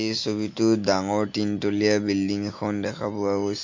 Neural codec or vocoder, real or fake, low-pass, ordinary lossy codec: none; real; 7.2 kHz; MP3, 48 kbps